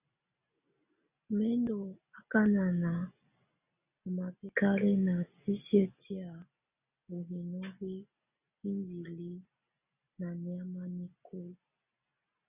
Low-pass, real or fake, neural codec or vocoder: 3.6 kHz; real; none